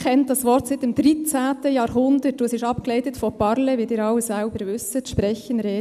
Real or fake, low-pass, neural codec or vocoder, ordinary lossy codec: real; 14.4 kHz; none; none